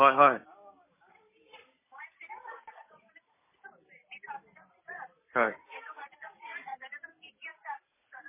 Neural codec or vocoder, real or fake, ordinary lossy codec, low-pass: none; real; MP3, 16 kbps; 3.6 kHz